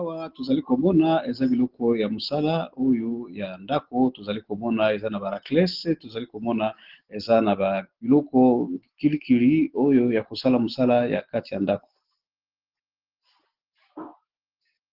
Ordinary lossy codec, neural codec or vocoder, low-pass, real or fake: Opus, 16 kbps; none; 5.4 kHz; real